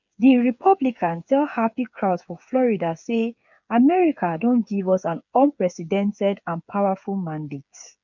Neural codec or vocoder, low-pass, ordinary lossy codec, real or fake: codec, 16 kHz, 8 kbps, FreqCodec, smaller model; 7.2 kHz; none; fake